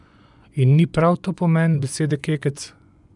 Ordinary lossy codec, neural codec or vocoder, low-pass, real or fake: none; vocoder, 24 kHz, 100 mel bands, Vocos; 10.8 kHz; fake